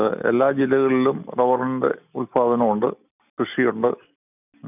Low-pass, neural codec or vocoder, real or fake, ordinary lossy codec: 3.6 kHz; none; real; none